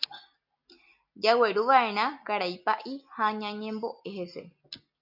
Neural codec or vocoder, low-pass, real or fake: none; 5.4 kHz; real